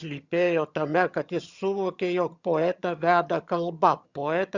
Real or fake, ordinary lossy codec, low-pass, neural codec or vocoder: fake; Opus, 64 kbps; 7.2 kHz; vocoder, 22.05 kHz, 80 mel bands, HiFi-GAN